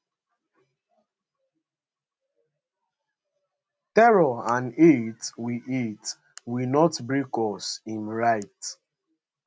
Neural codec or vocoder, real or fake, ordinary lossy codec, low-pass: none; real; none; none